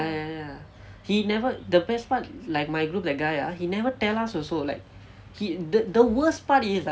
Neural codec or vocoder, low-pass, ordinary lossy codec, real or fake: none; none; none; real